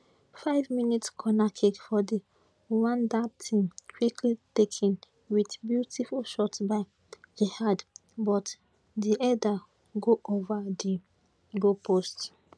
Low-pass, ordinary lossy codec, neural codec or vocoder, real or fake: none; none; none; real